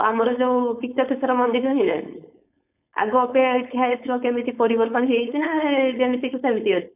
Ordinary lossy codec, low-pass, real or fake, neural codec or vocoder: none; 3.6 kHz; fake; codec, 16 kHz, 4.8 kbps, FACodec